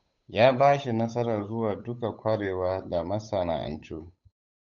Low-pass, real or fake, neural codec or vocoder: 7.2 kHz; fake; codec, 16 kHz, 8 kbps, FunCodec, trained on Chinese and English, 25 frames a second